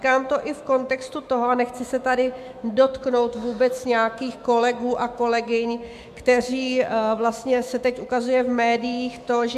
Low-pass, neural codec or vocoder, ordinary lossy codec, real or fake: 14.4 kHz; autoencoder, 48 kHz, 128 numbers a frame, DAC-VAE, trained on Japanese speech; AAC, 96 kbps; fake